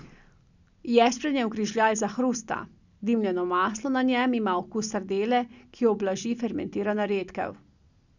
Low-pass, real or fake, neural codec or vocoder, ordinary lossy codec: 7.2 kHz; real; none; none